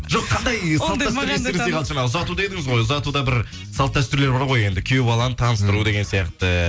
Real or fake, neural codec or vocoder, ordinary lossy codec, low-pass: real; none; none; none